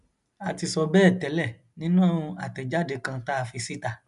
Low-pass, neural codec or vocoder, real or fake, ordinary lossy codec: 10.8 kHz; none; real; none